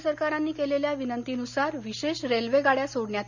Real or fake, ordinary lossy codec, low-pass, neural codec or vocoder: real; none; 7.2 kHz; none